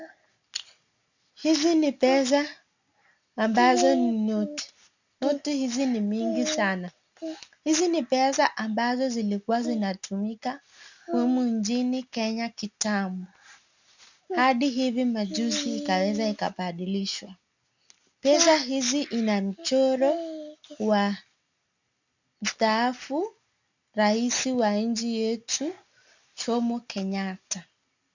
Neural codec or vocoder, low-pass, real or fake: none; 7.2 kHz; real